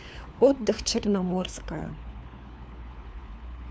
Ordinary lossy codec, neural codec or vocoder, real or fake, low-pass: none; codec, 16 kHz, 4 kbps, FunCodec, trained on LibriTTS, 50 frames a second; fake; none